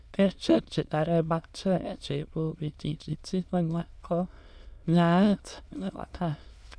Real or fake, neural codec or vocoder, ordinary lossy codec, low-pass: fake; autoencoder, 22.05 kHz, a latent of 192 numbers a frame, VITS, trained on many speakers; none; none